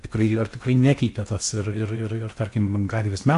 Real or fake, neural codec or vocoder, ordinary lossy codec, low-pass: fake; codec, 16 kHz in and 24 kHz out, 0.8 kbps, FocalCodec, streaming, 65536 codes; AAC, 64 kbps; 10.8 kHz